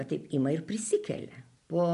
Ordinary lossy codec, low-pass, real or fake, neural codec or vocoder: MP3, 64 kbps; 10.8 kHz; real; none